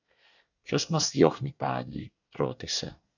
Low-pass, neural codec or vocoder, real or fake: 7.2 kHz; codec, 24 kHz, 1 kbps, SNAC; fake